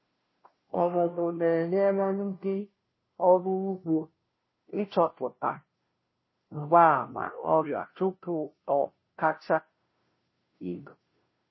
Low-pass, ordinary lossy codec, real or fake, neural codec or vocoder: 7.2 kHz; MP3, 24 kbps; fake; codec, 16 kHz, 0.5 kbps, FunCodec, trained on Chinese and English, 25 frames a second